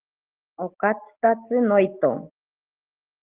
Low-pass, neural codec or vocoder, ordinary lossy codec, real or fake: 3.6 kHz; none; Opus, 16 kbps; real